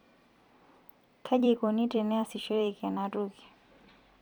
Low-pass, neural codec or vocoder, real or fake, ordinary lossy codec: 19.8 kHz; vocoder, 44.1 kHz, 128 mel bands every 256 samples, BigVGAN v2; fake; none